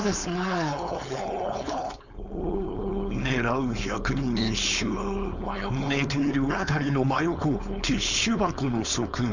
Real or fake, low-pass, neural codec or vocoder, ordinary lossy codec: fake; 7.2 kHz; codec, 16 kHz, 4.8 kbps, FACodec; none